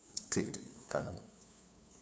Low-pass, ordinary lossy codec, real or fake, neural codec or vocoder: none; none; fake; codec, 16 kHz, 2 kbps, FunCodec, trained on LibriTTS, 25 frames a second